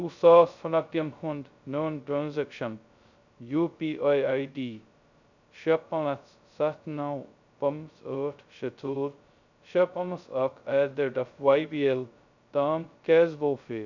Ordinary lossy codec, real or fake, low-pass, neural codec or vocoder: none; fake; 7.2 kHz; codec, 16 kHz, 0.2 kbps, FocalCodec